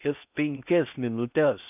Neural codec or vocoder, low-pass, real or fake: codec, 16 kHz in and 24 kHz out, 0.6 kbps, FocalCodec, streaming, 4096 codes; 3.6 kHz; fake